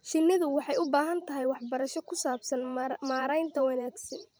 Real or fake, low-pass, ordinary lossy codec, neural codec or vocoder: fake; none; none; vocoder, 44.1 kHz, 128 mel bands every 512 samples, BigVGAN v2